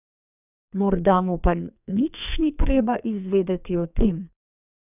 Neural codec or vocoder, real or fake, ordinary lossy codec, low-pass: codec, 44.1 kHz, 2.6 kbps, SNAC; fake; none; 3.6 kHz